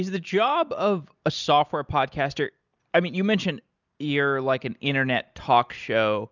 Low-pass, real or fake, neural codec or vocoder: 7.2 kHz; real; none